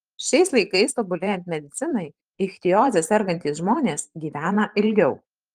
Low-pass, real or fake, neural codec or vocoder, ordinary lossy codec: 14.4 kHz; fake; vocoder, 44.1 kHz, 128 mel bands every 512 samples, BigVGAN v2; Opus, 24 kbps